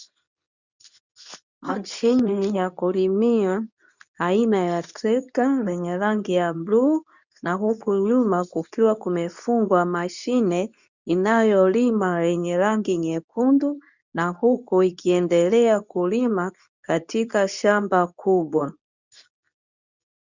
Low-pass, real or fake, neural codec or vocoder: 7.2 kHz; fake; codec, 24 kHz, 0.9 kbps, WavTokenizer, medium speech release version 2